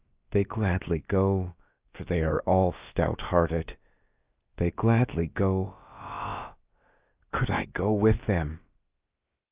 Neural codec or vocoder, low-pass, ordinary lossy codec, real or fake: codec, 16 kHz, about 1 kbps, DyCAST, with the encoder's durations; 3.6 kHz; Opus, 24 kbps; fake